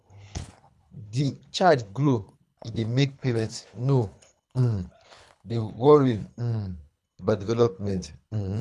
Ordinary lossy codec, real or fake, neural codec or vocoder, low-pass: none; fake; codec, 24 kHz, 3 kbps, HILCodec; none